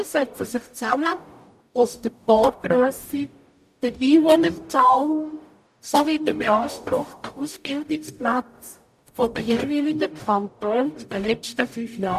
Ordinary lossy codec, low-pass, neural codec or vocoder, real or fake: none; 14.4 kHz; codec, 44.1 kHz, 0.9 kbps, DAC; fake